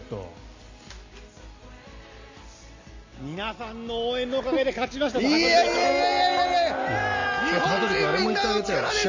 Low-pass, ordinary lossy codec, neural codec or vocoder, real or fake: 7.2 kHz; MP3, 48 kbps; none; real